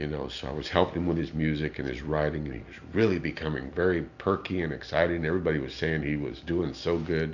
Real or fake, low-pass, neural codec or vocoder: real; 7.2 kHz; none